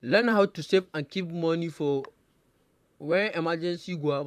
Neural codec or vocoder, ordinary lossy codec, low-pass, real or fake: vocoder, 44.1 kHz, 128 mel bands, Pupu-Vocoder; none; 14.4 kHz; fake